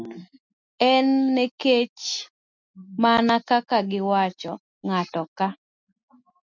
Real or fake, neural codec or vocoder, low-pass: real; none; 7.2 kHz